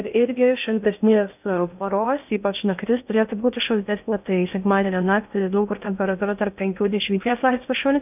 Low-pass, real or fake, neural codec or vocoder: 3.6 kHz; fake; codec, 16 kHz in and 24 kHz out, 0.6 kbps, FocalCodec, streaming, 2048 codes